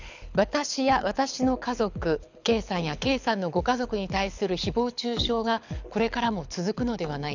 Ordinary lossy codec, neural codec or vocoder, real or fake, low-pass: none; codec, 24 kHz, 6 kbps, HILCodec; fake; 7.2 kHz